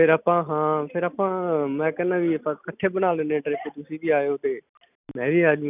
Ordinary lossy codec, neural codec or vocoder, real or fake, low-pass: none; none; real; 3.6 kHz